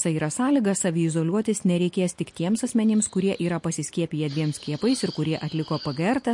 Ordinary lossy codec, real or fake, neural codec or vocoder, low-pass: MP3, 48 kbps; real; none; 10.8 kHz